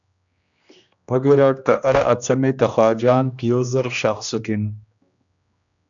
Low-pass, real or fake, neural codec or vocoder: 7.2 kHz; fake; codec, 16 kHz, 1 kbps, X-Codec, HuBERT features, trained on general audio